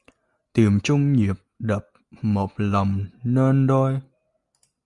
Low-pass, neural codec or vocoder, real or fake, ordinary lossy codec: 10.8 kHz; none; real; Opus, 64 kbps